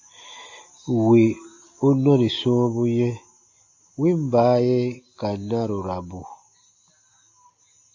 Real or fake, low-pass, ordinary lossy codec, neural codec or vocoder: real; 7.2 kHz; AAC, 48 kbps; none